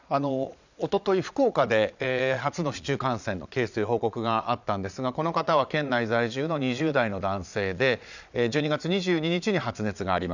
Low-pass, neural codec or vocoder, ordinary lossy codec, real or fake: 7.2 kHz; vocoder, 22.05 kHz, 80 mel bands, Vocos; none; fake